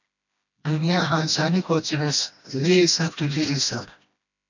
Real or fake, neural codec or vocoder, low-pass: fake; codec, 16 kHz, 1 kbps, FreqCodec, smaller model; 7.2 kHz